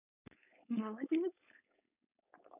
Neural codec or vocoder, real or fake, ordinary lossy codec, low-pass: codec, 16 kHz, 4.8 kbps, FACodec; fake; none; 3.6 kHz